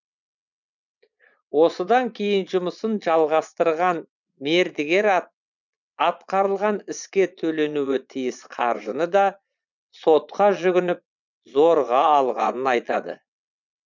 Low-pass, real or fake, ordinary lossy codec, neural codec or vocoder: 7.2 kHz; fake; none; vocoder, 22.05 kHz, 80 mel bands, Vocos